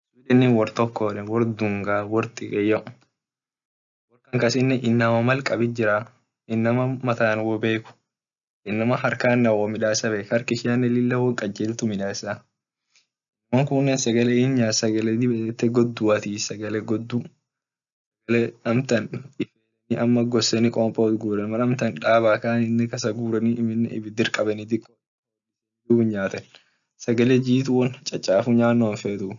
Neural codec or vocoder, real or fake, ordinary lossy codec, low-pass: none; real; none; 7.2 kHz